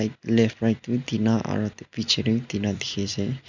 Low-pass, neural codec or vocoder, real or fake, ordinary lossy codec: 7.2 kHz; none; real; none